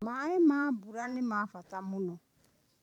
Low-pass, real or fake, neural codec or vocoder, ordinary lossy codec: 19.8 kHz; fake; vocoder, 44.1 kHz, 128 mel bands every 512 samples, BigVGAN v2; none